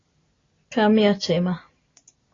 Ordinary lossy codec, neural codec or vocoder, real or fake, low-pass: AAC, 32 kbps; none; real; 7.2 kHz